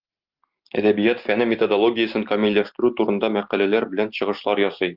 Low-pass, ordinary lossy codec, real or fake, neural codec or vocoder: 5.4 kHz; Opus, 32 kbps; real; none